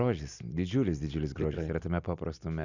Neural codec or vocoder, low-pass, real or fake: none; 7.2 kHz; real